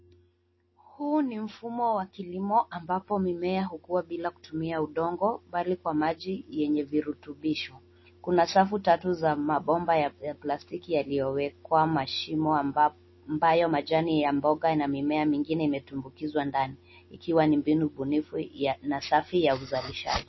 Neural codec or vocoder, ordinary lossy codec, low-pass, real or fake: none; MP3, 24 kbps; 7.2 kHz; real